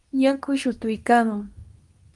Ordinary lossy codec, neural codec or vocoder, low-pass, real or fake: Opus, 32 kbps; codec, 24 kHz, 0.9 kbps, WavTokenizer, medium speech release version 2; 10.8 kHz; fake